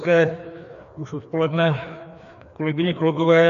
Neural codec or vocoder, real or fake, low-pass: codec, 16 kHz, 2 kbps, FreqCodec, larger model; fake; 7.2 kHz